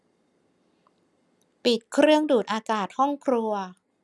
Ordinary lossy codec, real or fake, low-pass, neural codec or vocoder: none; real; none; none